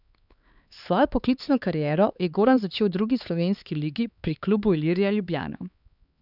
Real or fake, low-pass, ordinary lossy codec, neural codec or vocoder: fake; 5.4 kHz; none; codec, 16 kHz, 4 kbps, X-Codec, HuBERT features, trained on LibriSpeech